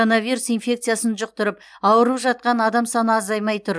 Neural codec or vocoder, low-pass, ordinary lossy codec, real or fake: none; none; none; real